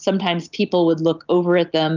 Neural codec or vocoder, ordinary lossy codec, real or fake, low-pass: none; Opus, 24 kbps; real; 7.2 kHz